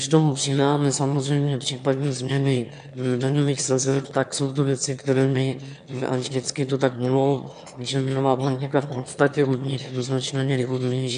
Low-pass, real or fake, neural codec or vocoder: 9.9 kHz; fake; autoencoder, 22.05 kHz, a latent of 192 numbers a frame, VITS, trained on one speaker